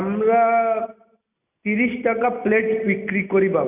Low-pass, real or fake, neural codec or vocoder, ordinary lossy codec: 3.6 kHz; real; none; none